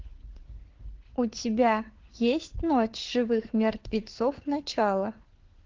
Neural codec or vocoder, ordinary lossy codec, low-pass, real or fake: codec, 16 kHz, 4 kbps, FunCodec, trained on LibriTTS, 50 frames a second; Opus, 16 kbps; 7.2 kHz; fake